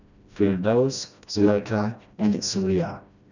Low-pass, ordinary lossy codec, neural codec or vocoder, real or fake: 7.2 kHz; none; codec, 16 kHz, 1 kbps, FreqCodec, smaller model; fake